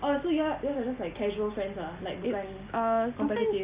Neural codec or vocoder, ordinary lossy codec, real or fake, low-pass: none; Opus, 64 kbps; real; 3.6 kHz